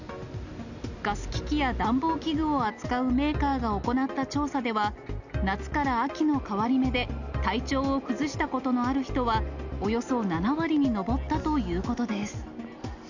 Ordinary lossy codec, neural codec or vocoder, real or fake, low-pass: none; none; real; 7.2 kHz